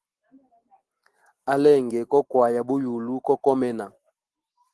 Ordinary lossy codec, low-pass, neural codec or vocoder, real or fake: Opus, 16 kbps; 10.8 kHz; none; real